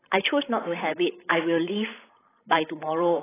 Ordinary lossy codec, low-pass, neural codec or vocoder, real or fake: AAC, 16 kbps; 3.6 kHz; codec, 16 kHz, 16 kbps, FreqCodec, larger model; fake